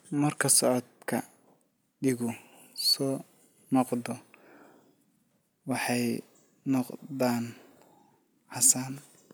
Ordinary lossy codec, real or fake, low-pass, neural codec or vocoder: none; real; none; none